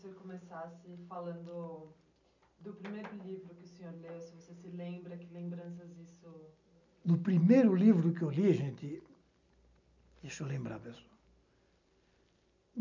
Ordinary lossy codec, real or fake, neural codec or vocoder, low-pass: none; real; none; 7.2 kHz